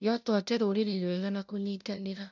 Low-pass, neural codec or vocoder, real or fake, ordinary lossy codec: 7.2 kHz; codec, 16 kHz, 0.5 kbps, FunCodec, trained on LibriTTS, 25 frames a second; fake; none